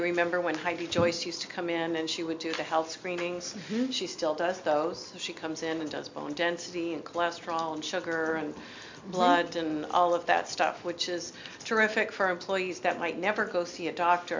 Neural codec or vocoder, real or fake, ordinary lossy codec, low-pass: none; real; MP3, 64 kbps; 7.2 kHz